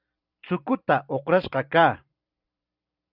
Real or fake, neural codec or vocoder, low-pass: fake; vocoder, 44.1 kHz, 128 mel bands every 256 samples, BigVGAN v2; 5.4 kHz